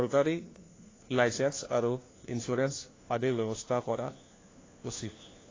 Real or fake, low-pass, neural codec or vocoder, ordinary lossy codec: fake; 7.2 kHz; codec, 16 kHz, 1 kbps, FunCodec, trained on LibriTTS, 50 frames a second; AAC, 32 kbps